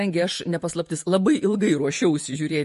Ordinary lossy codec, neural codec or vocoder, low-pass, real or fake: MP3, 48 kbps; vocoder, 48 kHz, 128 mel bands, Vocos; 14.4 kHz; fake